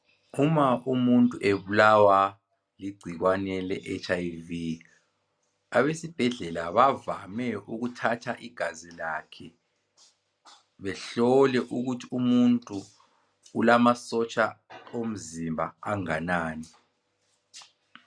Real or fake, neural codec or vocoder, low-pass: real; none; 9.9 kHz